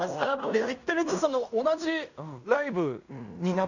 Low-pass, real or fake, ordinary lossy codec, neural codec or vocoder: 7.2 kHz; fake; none; codec, 16 kHz in and 24 kHz out, 0.9 kbps, LongCat-Audio-Codec, fine tuned four codebook decoder